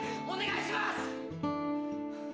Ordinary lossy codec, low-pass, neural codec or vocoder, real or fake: none; none; none; real